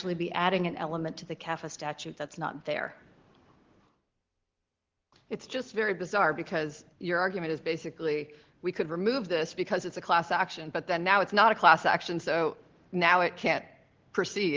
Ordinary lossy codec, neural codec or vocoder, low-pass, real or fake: Opus, 16 kbps; none; 7.2 kHz; real